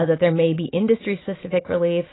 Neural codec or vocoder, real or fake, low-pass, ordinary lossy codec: none; real; 7.2 kHz; AAC, 16 kbps